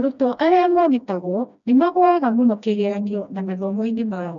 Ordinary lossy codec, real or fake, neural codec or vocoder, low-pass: none; fake; codec, 16 kHz, 1 kbps, FreqCodec, smaller model; 7.2 kHz